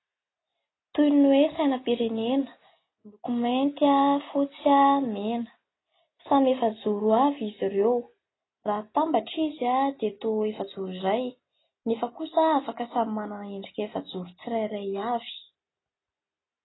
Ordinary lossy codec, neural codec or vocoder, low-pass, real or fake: AAC, 16 kbps; none; 7.2 kHz; real